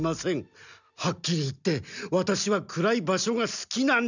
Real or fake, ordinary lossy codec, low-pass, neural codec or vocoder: real; none; 7.2 kHz; none